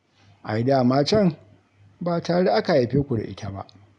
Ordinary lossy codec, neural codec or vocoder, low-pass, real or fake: none; none; 10.8 kHz; real